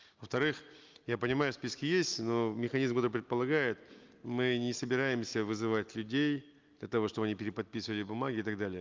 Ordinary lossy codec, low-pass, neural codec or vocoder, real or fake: Opus, 32 kbps; 7.2 kHz; none; real